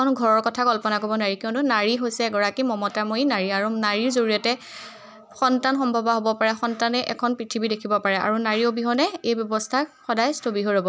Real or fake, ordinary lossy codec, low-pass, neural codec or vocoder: real; none; none; none